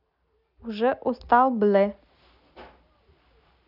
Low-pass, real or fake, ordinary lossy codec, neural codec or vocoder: 5.4 kHz; real; none; none